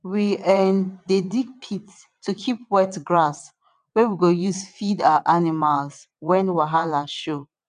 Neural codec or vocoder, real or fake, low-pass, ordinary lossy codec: vocoder, 22.05 kHz, 80 mel bands, WaveNeXt; fake; 9.9 kHz; none